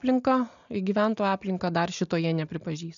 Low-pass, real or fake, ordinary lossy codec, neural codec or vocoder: 7.2 kHz; real; MP3, 96 kbps; none